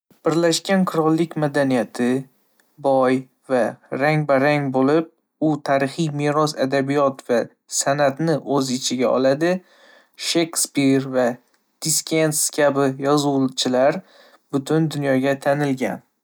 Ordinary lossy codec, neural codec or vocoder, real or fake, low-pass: none; none; real; none